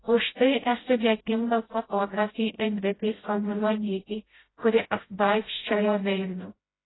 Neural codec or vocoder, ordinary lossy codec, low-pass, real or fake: codec, 16 kHz, 0.5 kbps, FreqCodec, smaller model; AAC, 16 kbps; 7.2 kHz; fake